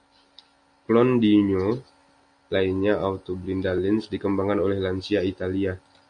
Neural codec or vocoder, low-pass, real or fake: none; 9.9 kHz; real